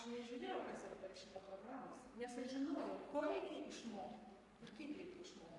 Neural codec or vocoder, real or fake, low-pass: codec, 44.1 kHz, 3.4 kbps, Pupu-Codec; fake; 10.8 kHz